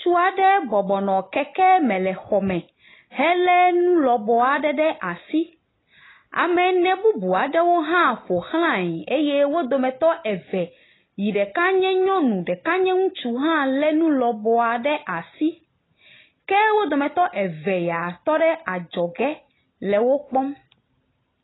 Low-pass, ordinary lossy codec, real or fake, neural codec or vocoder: 7.2 kHz; AAC, 16 kbps; real; none